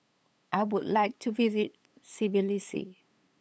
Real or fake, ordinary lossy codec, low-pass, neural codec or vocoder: fake; none; none; codec, 16 kHz, 8 kbps, FunCodec, trained on LibriTTS, 25 frames a second